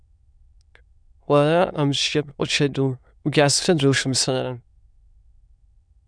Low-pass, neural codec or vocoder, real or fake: 9.9 kHz; autoencoder, 22.05 kHz, a latent of 192 numbers a frame, VITS, trained on many speakers; fake